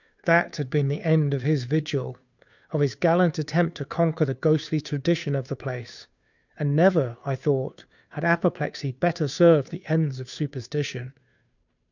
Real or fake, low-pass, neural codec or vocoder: fake; 7.2 kHz; codec, 16 kHz, 2 kbps, FunCodec, trained on Chinese and English, 25 frames a second